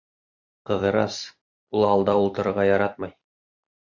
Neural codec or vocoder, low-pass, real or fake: vocoder, 44.1 kHz, 128 mel bands every 256 samples, BigVGAN v2; 7.2 kHz; fake